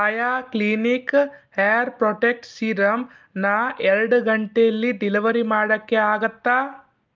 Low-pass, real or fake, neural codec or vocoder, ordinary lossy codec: 7.2 kHz; real; none; Opus, 24 kbps